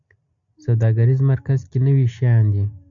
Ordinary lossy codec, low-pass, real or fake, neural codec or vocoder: AAC, 64 kbps; 7.2 kHz; real; none